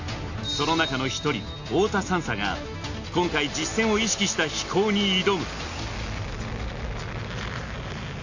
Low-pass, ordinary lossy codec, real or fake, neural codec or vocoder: 7.2 kHz; AAC, 48 kbps; real; none